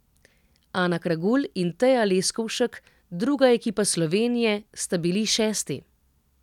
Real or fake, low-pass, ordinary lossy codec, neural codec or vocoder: real; 19.8 kHz; none; none